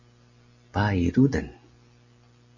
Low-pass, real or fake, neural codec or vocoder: 7.2 kHz; real; none